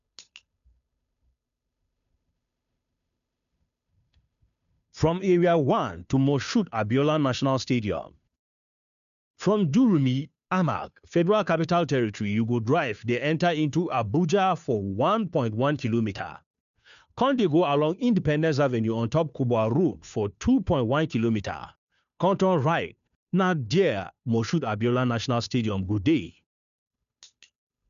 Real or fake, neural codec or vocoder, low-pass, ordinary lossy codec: fake; codec, 16 kHz, 2 kbps, FunCodec, trained on Chinese and English, 25 frames a second; 7.2 kHz; none